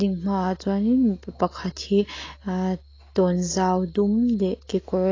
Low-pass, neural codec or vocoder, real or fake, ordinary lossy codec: 7.2 kHz; none; real; AAC, 32 kbps